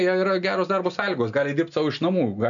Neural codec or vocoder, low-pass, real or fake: none; 7.2 kHz; real